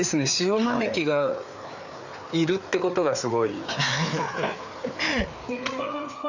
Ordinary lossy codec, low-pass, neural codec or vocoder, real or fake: none; 7.2 kHz; codec, 16 kHz, 4 kbps, FreqCodec, larger model; fake